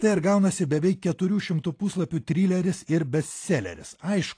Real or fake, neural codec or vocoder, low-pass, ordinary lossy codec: real; none; 9.9 kHz; AAC, 48 kbps